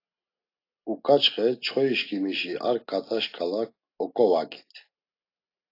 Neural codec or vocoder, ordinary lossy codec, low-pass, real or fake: none; AAC, 32 kbps; 5.4 kHz; real